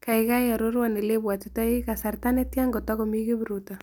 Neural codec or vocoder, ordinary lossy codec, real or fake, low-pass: none; none; real; none